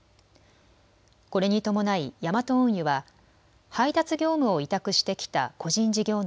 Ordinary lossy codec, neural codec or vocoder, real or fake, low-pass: none; none; real; none